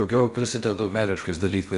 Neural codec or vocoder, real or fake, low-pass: codec, 16 kHz in and 24 kHz out, 0.6 kbps, FocalCodec, streaming, 4096 codes; fake; 10.8 kHz